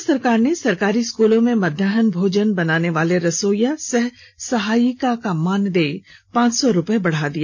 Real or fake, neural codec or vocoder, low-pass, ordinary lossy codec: real; none; 7.2 kHz; none